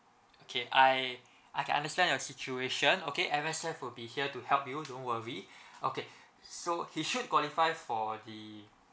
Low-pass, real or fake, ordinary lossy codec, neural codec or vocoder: none; real; none; none